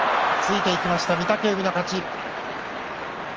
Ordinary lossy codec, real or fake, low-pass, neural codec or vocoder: Opus, 24 kbps; real; 7.2 kHz; none